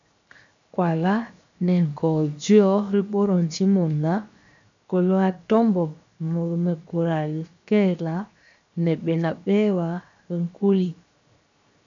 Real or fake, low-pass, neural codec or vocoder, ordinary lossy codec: fake; 7.2 kHz; codec, 16 kHz, 0.7 kbps, FocalCodec; MP3, 64 kbps